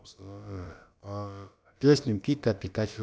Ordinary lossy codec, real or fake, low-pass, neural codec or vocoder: none; fake; none; codec, 16 kHz, about 1 kbps, DyCAST, with the encoder's durations